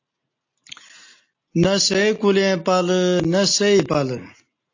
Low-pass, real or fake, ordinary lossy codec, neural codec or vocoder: 7.2 kHz; real; AAC, 48 kbps; none